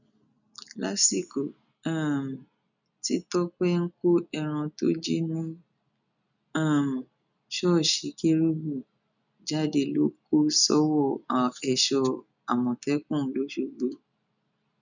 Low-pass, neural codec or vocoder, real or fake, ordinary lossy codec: 7.2 kHz; none; real; none